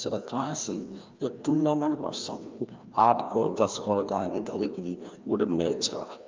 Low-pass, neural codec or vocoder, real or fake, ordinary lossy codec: 7.2 kHz; codec, 16 kHz, 1 kbps, FreqCodec, larger model; fake; Opus, 32 kbps